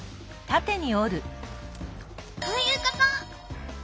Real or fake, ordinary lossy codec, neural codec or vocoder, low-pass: real; none; none; none